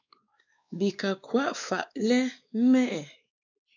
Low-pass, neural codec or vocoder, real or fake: 7.2 kHz; codec, 16 kHz, 2 kbps, X-Codec, WavLM features, trained on Multilingual LibriSpeech; fake